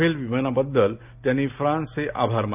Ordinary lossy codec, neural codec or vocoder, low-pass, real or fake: none; none; 3.6 kHz; real